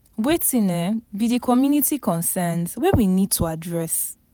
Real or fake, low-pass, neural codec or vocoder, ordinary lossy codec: fake; none; vocoder, 48 kHz, 128 mel bands, Vocos; none